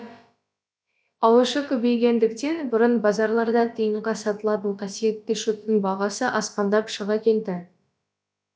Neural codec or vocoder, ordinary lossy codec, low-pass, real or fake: codec, 16 kHz, about 1 kbps, DyCAST, with the encoder's durations; none; none; fake